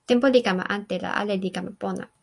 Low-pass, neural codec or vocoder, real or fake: 10.8 kHz; none; real